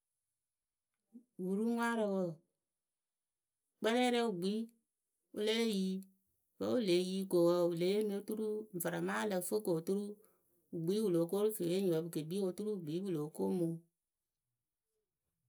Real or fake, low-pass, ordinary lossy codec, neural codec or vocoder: real; none; none; none